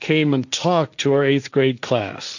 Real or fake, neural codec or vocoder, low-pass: fake; codec, 16 kHz, 1.1 kbps, Voila-Tokenizer; 7.2 kHz